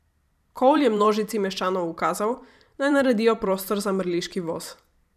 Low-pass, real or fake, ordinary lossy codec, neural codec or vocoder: 14.4 kHz; fake; none; vocoder, 44.1 kHz, 128 mel bands every 512 samples, BigVGAN v2